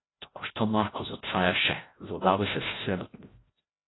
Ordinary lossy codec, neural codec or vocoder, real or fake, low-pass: AAC, 16 kbps; codec, 16 kHz, 0.5 kbps, FreqCodec, larger model; fake; 7.2 kHz